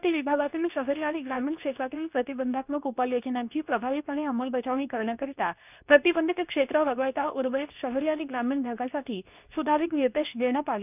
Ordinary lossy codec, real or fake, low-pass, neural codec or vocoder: none; fake; 3.6 kHz; codec, 24 kHz, 0.9 kbps, WavTokenizer, medium speech release version 2